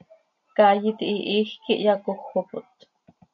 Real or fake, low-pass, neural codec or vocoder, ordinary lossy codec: real; 7.2 kHz; none; AAC, 64 kbps